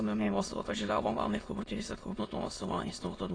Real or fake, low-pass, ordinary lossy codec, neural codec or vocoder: fake; 9.9 kHz; AAC, 32 kbps; autoencoder, 22.05 kHz, a latent of 192 numbers a frame, VITS, trained on many speakers